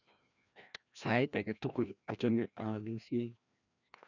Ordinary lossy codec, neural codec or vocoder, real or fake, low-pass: none; codec, 16 kHz, 1 kbps, FreqCodec, larger model; fake; 7.2 kHz